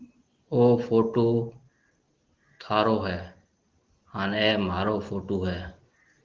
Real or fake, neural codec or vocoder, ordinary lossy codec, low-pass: real; none; Opus, 16 kbps; 7.2 kHz